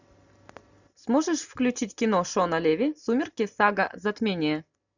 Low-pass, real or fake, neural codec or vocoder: 7.2 kHz; real; none